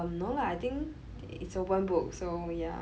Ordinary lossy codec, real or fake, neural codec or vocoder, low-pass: none; real; none; none